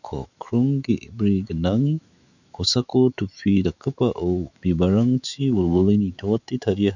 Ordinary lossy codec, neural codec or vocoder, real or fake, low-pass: none; autoencoder, 48 kHz, 128 numbers a frame, DAC-VAE, trained on Japanese speech; fake; 7.2 kHz